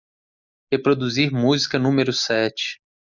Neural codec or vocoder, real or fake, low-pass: none; real; 7.2 kHz